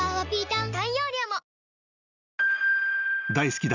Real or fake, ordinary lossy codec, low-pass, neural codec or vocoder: real; none; 7.2 kHz; none